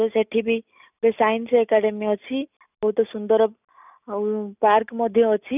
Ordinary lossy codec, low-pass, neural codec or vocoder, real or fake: none; 3.6 kHz; none; real